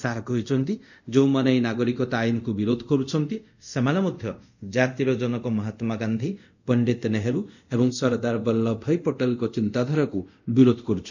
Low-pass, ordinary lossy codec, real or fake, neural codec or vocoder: 7.2 kHz; none; fake; codec, 24 kHz, 0.5 kbps, DualCodec